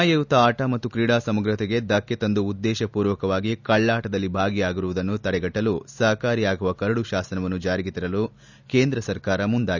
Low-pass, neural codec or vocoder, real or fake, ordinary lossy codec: 7.2 kHz; none; real; none